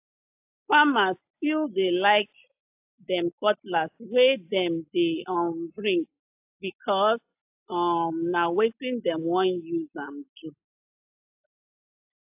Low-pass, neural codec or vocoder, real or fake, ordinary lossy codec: 3.6 kHz; none; real; AAC, 32 kbps